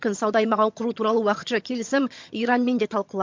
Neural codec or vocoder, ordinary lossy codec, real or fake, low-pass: vocoder, 22.05 kHz, 80 mel bands, HiFi-GAN; MP3, 48 kbps; fake; 7.2 kHz